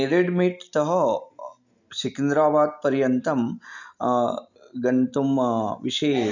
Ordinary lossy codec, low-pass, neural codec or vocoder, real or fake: none; 7.2 kHz; none; real